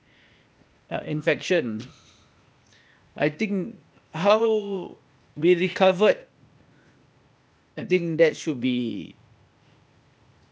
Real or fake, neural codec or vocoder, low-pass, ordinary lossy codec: fake; codec, 16 kHz, 0.8 kbps, ZipCodec; none; none